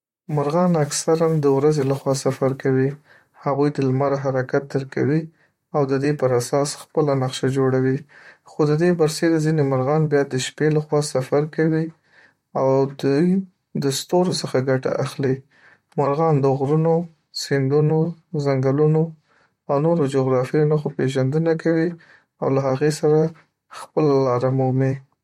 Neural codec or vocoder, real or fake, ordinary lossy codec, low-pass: vocoder, 44.1 kHz, 128 mel bands, Pupu-Vocoder; fake; MP3, 64 kbps; 19.8 kHz